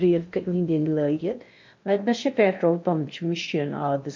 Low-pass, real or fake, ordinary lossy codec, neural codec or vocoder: 7.2 kHz; fake; MP3, 48 kbps; codec, 16 kHz in and 24 kHz out, 0.6 kbps, FocalCodec, streaming, 2048 codes